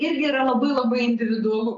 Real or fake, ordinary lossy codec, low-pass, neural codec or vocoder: real; AAC, 48 kbps; 7.2 kHz; none